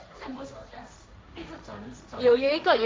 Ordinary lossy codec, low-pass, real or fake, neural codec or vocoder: none; none; fake; codec, 16 kHz, 1.1 kbps, Voila-Tokenizer